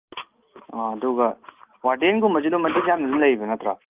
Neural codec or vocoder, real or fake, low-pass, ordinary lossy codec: none; real; 3.6 kHz; Opus, 32 kbps